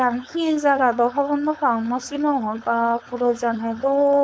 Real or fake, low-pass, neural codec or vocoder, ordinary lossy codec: fake; none; codec, 16 kHz, 4.8 kbps, FACodec; none